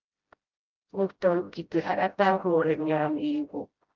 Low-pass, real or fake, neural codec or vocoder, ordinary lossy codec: 7.2 kHz; fake; codec, 16 kHz, 0.5 kbps, FreqCodec, smaller model; Opus, 24 kbps